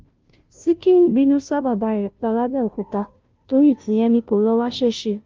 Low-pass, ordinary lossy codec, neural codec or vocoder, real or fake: 7.2 kHz; Opus, 24 kbps; codec, 16 kHz, 0.5 kbps, FunCodec, trained on Chinese and English, 25 frames a second; fake